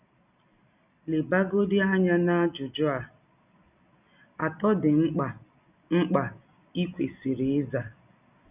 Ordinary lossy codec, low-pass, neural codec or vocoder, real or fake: none; 3.6 kHz; none; real